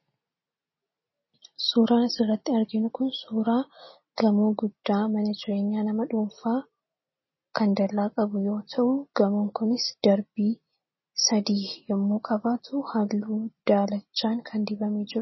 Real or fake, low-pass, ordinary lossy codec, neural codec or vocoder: real; 7.2 kHz; MP3, 24 kbps; none